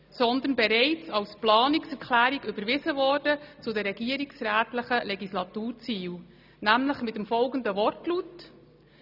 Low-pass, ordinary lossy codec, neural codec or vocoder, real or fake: 5.4 kHz; none; none; real